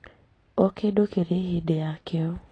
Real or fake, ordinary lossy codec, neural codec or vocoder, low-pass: real; AAC, 32 kbps; none; 9.9 kHz